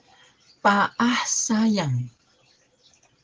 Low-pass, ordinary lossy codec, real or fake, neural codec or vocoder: 7.2 kHz; Opus, 16 kbps; real; none